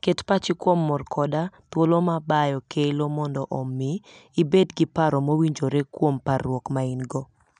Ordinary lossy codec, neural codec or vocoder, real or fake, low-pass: none; none; real; 9.9 kHz